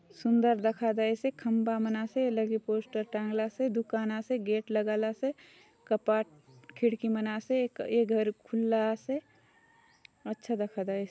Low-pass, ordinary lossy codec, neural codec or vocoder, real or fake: none; none; none; real